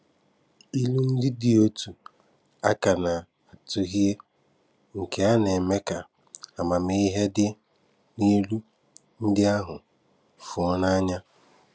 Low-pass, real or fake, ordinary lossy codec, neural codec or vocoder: none; real; none; none